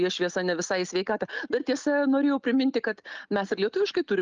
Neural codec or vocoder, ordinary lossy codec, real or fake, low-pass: none; Opus, 24 kbps; real; 7.2 kHz